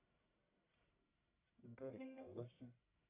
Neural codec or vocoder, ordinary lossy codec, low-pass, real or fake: codec, 44.1 kHz, 1.7 kbps, Pupu-Codec; MP3, 24 kbps; 3.6 kHz; fake